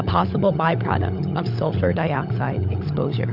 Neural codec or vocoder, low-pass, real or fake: codec, 16 kHz, 16 kbps, FunCodec, trained on LibriTTS, 50 frames a second; 5.4 kHz; fake